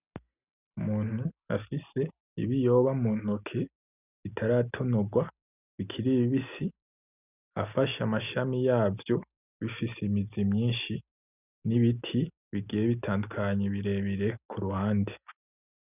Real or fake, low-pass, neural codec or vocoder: real; 3.6 kHz; none